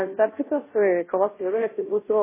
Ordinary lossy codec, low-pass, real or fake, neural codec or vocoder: MP3, 16 kbps; 3.6 kHz; fake; codec, 16 kHz, 0.5 kbps, FunCodec, trained on Chinese and English, 25 frames a second